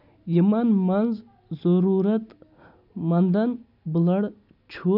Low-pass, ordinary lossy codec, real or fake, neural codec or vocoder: 5.4 kHz; none; real; none